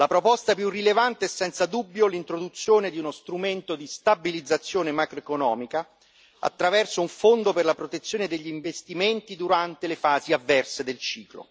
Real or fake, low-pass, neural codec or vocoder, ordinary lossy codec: real; none; none; none